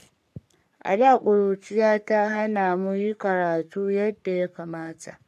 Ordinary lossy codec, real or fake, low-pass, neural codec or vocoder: none; fake; 14.4 kHz; codec, 44.1 kHz, 3.4 kbps, Pupu-Codec